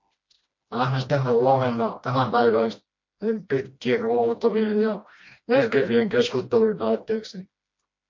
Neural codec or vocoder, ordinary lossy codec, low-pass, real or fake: codec, 16 kHz, 1 kbps, FreqCodec, smaller model; MP3, 48 kbps; 7.2 kHz; fake